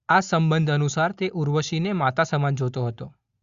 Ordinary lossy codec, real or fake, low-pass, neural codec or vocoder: Opus, 64 kbps; real; 7.2 kHz; none